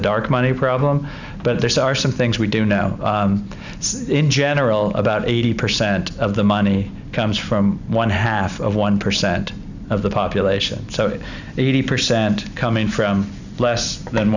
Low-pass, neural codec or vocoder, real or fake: 7.2 kHz; none; real